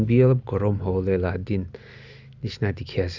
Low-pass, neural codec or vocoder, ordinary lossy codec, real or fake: 7.2 kHz; none; Opus, 64 kbps; real